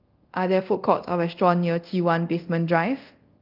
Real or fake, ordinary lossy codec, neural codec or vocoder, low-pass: fake; Opus, 32 kbps; codec, 24 kHz, 0.5 kbps, DualCodec; 5.4 kHz